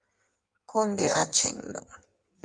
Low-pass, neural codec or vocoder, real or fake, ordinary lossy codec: 9.9 kHz; codec, 16 kHz in and 24 kHz out, 1.1 kbps, FireRedTTS-2 codec; fake; Opus, 32 kbps